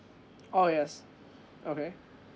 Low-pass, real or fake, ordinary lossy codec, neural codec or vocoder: none; real; none; none